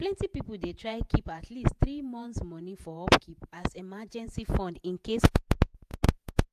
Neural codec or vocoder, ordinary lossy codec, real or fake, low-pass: vocoder, 48 kHz, 128 mel bands, Vocos; AAC, 96 kbps; fake; 14.4 kHz